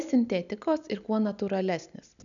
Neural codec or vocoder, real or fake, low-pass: none; real; 7.2 kHz